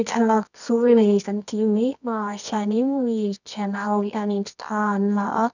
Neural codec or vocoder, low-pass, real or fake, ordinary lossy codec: codec, 24 kHz, 0.9 kbps, WavTokenizer, medium music audio release; 7.2 kHz; fake; none